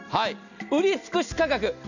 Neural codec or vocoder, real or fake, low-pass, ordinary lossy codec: none; real; 7.2 kHz; none